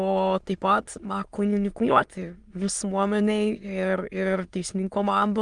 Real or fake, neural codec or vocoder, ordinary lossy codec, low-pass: fake; autoencoder, 22.05 kHz, a latent of 192 numbers a frame, VITS, trained on many speakers; Opus, 32 kbps; 9.9 kHz